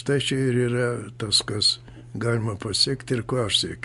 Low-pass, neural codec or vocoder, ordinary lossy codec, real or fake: 10.8 kHz; none; MP3, 64 kbps; real